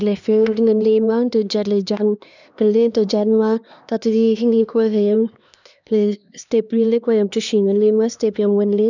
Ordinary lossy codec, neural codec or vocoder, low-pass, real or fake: none; codec, 16 kHz, 2 kbps, X-Codec, HuBERT features, trained on LibriSpeech; 7.2 kHz; fake